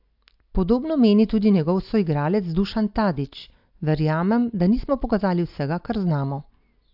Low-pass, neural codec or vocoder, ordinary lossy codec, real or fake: 5.4 kHz; none; AAC, 48 kbps; real